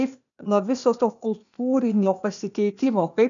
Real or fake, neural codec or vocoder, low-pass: fake; codec, 16 kHz, 0.8 kbps, ZipCodec; 7.2 kHz